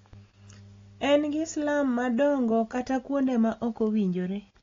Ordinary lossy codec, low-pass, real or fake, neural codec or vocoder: AAC, 32 kbps; 7.2 kHz; real; none